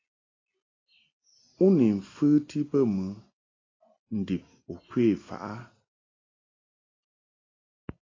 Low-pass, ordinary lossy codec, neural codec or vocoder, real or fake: 7.2 kHz; AAC, 32 kbps; none; real